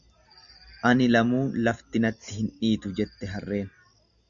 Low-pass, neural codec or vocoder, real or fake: 7.2 kHz; none; real